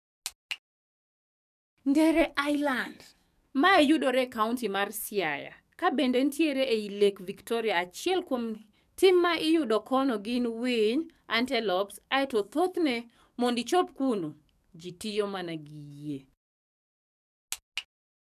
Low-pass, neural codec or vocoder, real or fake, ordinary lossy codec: 14.4 kHz; codec, 44.1 kHz, 7.8 kbps, DAC; fake; none